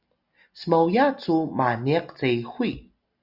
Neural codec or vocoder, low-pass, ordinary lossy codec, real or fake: none; 5.4 kHz; Opus, 64 kbps; real